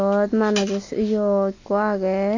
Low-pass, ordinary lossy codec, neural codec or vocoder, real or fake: 7.2 kHz; AAC, 48 kbps; none; real